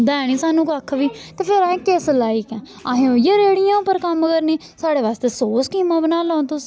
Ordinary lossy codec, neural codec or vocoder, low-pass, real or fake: none; none; none; real